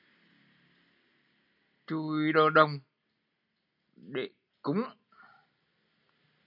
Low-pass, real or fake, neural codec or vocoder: 5.4 kHz; real; none